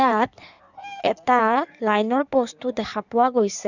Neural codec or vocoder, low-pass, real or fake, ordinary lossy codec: codec, 16 kHz in and 24 kHz out, 1.1 kbps, FireRedTTS-2 codec; 7.2 kHz; fake; none